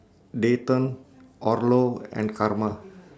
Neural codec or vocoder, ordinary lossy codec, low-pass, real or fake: none; none; none; real